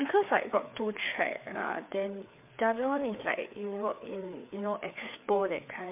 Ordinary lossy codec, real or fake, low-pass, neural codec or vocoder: MP3, 32 kbps; fake; 3.6 kHz; codec, 16 kHz, 4 kbps, FreqCodec, larger model